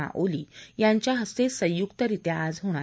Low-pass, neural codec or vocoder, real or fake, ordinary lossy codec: none; none; real; none